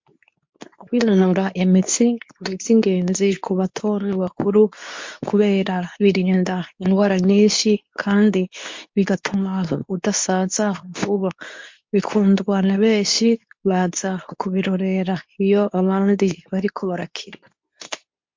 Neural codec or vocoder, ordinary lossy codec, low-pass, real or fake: codec, 24 kHz, 0.9 kbps, WavTokenizer, medium speech release version 2; MP3, 48 kbps; 7.2 kHz; fake